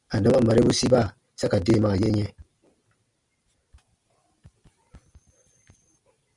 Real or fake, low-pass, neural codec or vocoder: real; 10.8 kHz; none